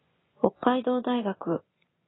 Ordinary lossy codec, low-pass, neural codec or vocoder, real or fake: AAC, 16 kbps; 7.2 kHz; vocoder, 24 kHz, 100 mel bands, Vocos; fake